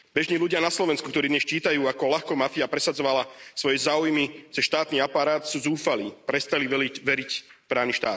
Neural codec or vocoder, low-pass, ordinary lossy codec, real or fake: none; none; none; real